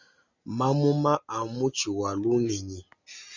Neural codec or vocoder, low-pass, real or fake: none; 7.2 kHz; real